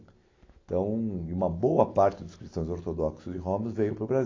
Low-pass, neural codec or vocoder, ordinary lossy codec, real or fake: 7.2 kHz; none; AAC, 32 kbps; real